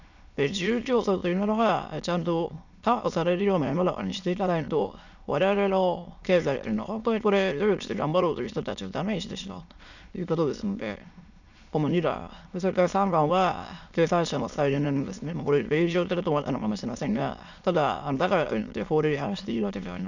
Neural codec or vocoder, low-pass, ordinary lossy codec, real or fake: autoencoder, 22.05 kHz, a latent of 192 numbers a frame, VITS, trained on many speakers; 7.2 kHz; none; fake